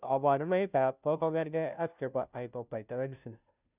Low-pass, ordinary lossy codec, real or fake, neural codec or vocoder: 3.6 kHz; none; fake; codec, 16 kHz, 0.5 kbps, FunCodec, trained on Chinese and English, 25 frames a second